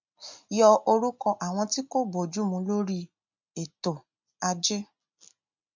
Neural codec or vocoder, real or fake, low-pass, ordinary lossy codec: none; real; 7.2 kHz; MP3, 64 kbps